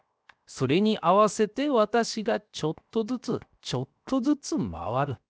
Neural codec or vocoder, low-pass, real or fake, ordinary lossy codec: codec, 16 kHz, 0.7 kbps, FocalCodec; none; fake; none